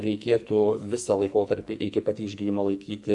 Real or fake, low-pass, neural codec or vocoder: fake; 10.8 kHz; codec, 44.1 kHz, 2.6 kbps, SNAC